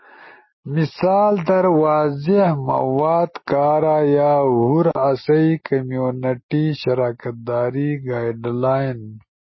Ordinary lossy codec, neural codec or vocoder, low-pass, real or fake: MP3, 24 kbps; none; 7.2 kHz; real